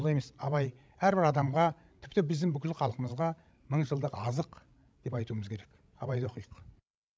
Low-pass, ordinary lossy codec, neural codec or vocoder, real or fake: none; none; codec, 16 kHz, 16 kbps, FreqCodec, larger model; fake